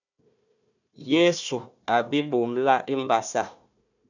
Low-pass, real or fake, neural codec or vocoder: 7.2 kHz; fake; codec, 16 kHz, 1 kbps, FunCodec, trained on Chinese and English, 50 frames a second